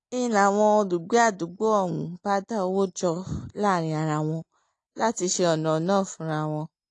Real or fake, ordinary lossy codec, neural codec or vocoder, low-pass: real; AAC, 48 kbps; none; 10.8 kHz